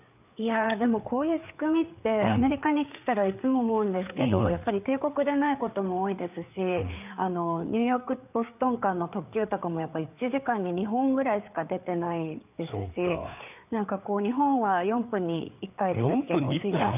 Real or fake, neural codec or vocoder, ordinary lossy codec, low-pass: fake; codec, 16 kHz, 4 kbps, FreqCodec, larger model; none; 3.6 kHz